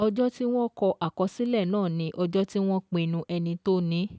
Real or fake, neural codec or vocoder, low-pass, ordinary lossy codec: real; none; none; none